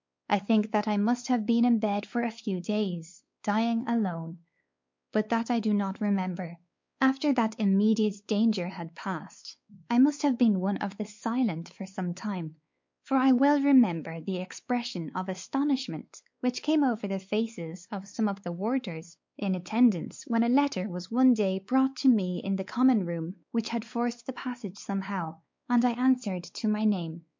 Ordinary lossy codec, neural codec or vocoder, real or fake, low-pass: MP3, 48 kbps; codec, 16 kHz, 4 kbps, X-Codec, WavLM features, trained on Multilingual LibriSpeech; fake; 7.2 kHz